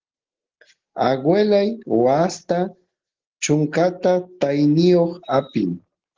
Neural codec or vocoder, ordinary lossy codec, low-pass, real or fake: none; Opus, 16 kbps; 7.2 kHz; real